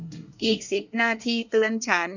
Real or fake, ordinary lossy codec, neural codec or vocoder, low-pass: fake; none; codec, 24 kHz, 1 kbps, SNAC; 7.2 kHz